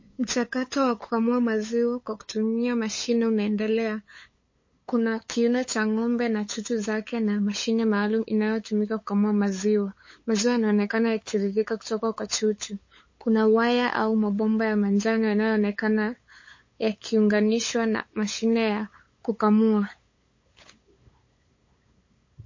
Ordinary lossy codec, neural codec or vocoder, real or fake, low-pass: MP3, 32 kbps; codec, 16 kHz, 8 kbps, FunCodec, trained on LibriTTS, 25 frames a second; fake; 7.2 kHz